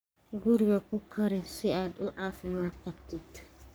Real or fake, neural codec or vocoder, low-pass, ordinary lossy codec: fake; codec, 44.1 kHz, 3.4 kbps, Pupu-Codec; none; none